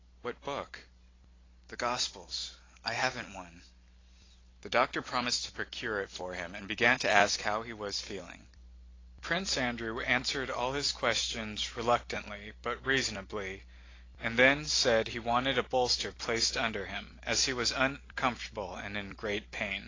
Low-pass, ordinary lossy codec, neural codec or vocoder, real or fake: 7.2 kHz; AAC, 32 kbps; none; real